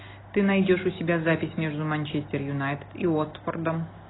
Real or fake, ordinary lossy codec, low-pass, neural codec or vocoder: real; AAC, 16 kbps; 7.2 kHz; none